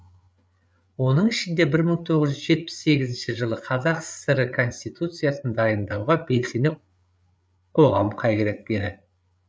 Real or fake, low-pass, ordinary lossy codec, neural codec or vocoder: fake; none; none; codec, 16 kHz, 8 kbps, FreqCodec, larger model